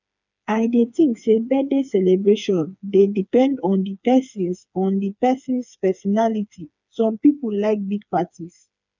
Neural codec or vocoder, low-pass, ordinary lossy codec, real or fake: codec, 16 kHz, 4 kbps, FreqCodec, smaller model; 7.2 kHz; none; fake